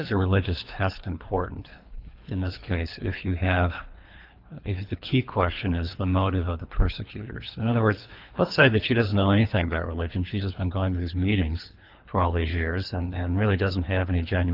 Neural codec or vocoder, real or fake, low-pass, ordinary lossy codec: codec, 24 kHz, 3 kbps, HILCodec; fake; 5.4 kHz; Opus, 24 kbps